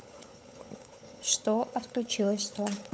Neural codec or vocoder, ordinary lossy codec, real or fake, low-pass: codec, 16 kHz, 16 kbps, FunCodec, trained on LibriTTS, 50 frames a second; none; fake; none